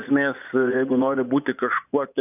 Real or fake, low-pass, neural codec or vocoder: fake; 3.6 kHz; vocoder, 24 kHz, 100 mel bands, Vocos